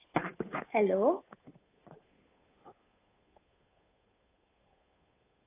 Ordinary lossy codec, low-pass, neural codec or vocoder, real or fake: AAC, 16 kbps; 3.6 kHz; codec, 16 kHz, 8 kbps, FunCodec, trained on Chinese and English, 25 frames a second; fake